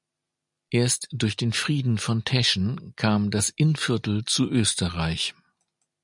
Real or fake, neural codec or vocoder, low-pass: real; none; 10.8 kHz